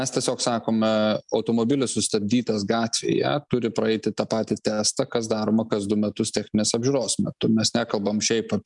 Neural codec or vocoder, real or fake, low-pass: none; real; 10.8 kHz